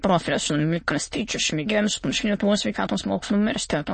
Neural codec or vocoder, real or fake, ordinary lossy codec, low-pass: autoencoder, 22.05 kHz, a latent of 192 numbers a frame, VITS, trained on many speakers; fake; MP3, 32 kbps; 9.9 kHz